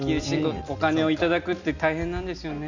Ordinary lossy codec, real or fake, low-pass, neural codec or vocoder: none; real; 7.2 kHz; none